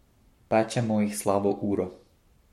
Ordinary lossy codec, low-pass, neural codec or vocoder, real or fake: MP3, 64 kbps; 19.8 kHz; codec, 44.1 kHz, 7.8 kbps, Pupu-Codec; fake